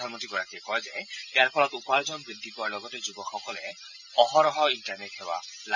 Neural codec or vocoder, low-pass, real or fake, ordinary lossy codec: none; 7.2 kHz; real; none